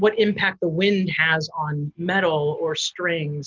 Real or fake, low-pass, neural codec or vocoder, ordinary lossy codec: real; 7.2 kHz; none; Opus, 32 kbps